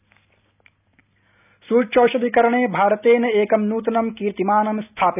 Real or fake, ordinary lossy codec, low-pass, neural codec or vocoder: real; none; 3.6 kHz; none